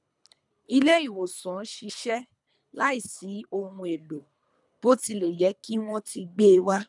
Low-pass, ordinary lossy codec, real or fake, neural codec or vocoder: 10.8 kHz; none; fake; codec, 24 kHz, 3 kbps, HILCodec